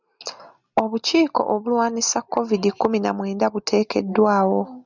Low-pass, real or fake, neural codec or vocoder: 7.2 kHz; real; none